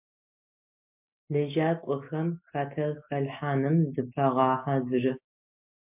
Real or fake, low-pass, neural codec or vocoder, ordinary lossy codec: real; 3.6 kHz; none; MP3, 32 kbps